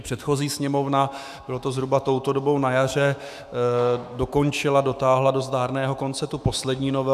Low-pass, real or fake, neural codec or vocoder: 14.4 kHz; fake; autoencoder, 48 kHz, 128 numbers a frame, DAC-VAE, trained on Japanese speech